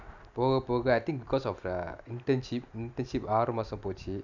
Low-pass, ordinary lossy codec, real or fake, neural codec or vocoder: 7.2 kHz; none; real; none